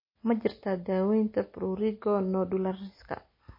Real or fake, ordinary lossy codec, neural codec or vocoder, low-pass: real; MP3, 24 kbps; none; 5.4 kHz